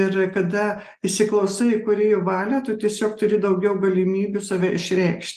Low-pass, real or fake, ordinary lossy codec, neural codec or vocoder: 14.4 kHz; real; Opus, 24 kbps; none